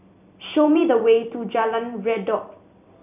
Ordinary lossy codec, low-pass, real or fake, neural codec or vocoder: none; 3.6 kHz; fake; vocoder, 44.1 kHz, 128 mel bands every 256 samples, BigVGAN v2